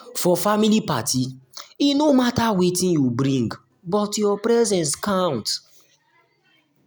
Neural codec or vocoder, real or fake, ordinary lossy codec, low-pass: none; real; none; none